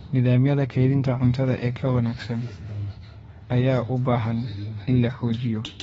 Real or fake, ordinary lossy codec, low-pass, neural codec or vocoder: fake; AAC, 24 kbps; 19.8 kHz; autoencoder, 48 kHz, 32 numbers a frame, DAC-VAE, trained on Japanese speech